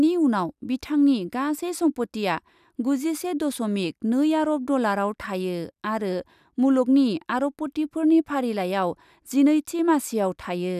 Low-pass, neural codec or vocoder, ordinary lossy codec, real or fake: 14.4 kHz; none; none; real